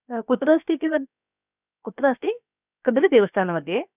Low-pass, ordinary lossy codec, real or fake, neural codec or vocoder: 3.6 kHz; none; fake; codec, 16 kHz, about 1 kbps, DyCAST, with the encoder's durations